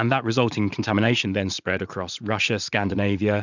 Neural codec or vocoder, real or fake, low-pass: vocoder, 44.1 kHz, 80 mel bands, Vocos; fake; 7.2 kHz